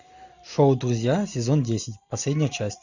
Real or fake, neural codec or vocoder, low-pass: real; none; 7.2 kHz